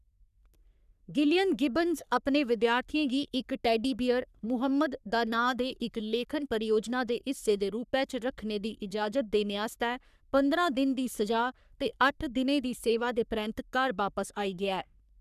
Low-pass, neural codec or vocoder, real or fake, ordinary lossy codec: 14.4 kHz; codec, 44.1 kHz, 3.4 kbps, Pupu-Codec; fake; none